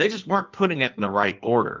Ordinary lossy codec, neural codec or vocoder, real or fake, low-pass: Opus, 24 kbps; codec, 16 kHz in and 24 kHz out, 1.1 kbps, FireRedTTS-2 codec; fake; 7.2 kHz